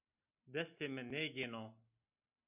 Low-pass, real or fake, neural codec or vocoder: 3.6 kHz; real; none